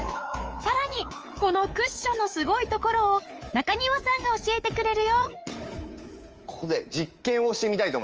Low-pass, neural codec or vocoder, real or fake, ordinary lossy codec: 7.2 kHz; codec, 24 kHz, 3.1 kbps, DualCodec; fake; Opus, 24 kbps